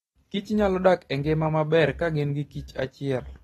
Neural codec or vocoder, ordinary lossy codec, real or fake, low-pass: none; AAC, 32 kbps; real; 19.8 kHz